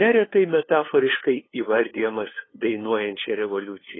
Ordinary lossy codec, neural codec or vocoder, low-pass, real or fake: AAC, 16 kbps; codec, 16 kHz, 2 kbps, FunCodec, trained on LibriTTS, 25 frames a second; 7.2 kHz; fake